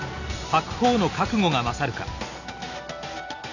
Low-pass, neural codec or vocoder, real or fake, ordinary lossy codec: 7.2 kHz; none; real; none